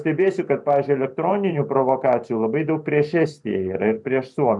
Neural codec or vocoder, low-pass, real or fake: vocoder, 44.1 kHz, 128 mel bands every 512 samples, BigVGAN v2; 10.8 kHz; fake